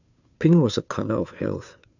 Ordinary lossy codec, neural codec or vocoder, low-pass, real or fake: none; codec, 16 kHz, 2 kbps, FunCodec, trained on Chinese and English, 25 frames a second; 7.2 kHz; fake